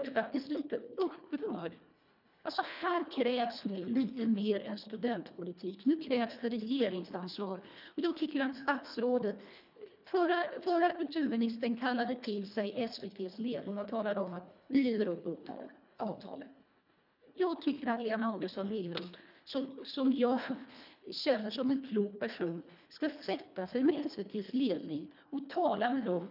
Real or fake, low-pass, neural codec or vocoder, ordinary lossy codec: fake; 5.4 kHz; codec, 24 kHz, 1.5 kbps, HILCodec; none